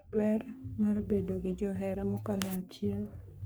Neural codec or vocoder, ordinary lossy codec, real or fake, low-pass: codec, 44.1 kHz, 3.4 kbps, Pupu-Codec; none; fake; none